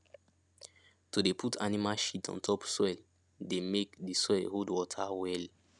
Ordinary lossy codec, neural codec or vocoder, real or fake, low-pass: none; none; real; 10.8 kHz